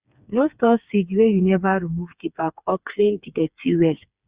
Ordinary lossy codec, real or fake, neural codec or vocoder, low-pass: Opus, 64 kbps; fake; codec, 16 kHz, 4 kbps, FreqCodec, smaller model; 3.6 kHz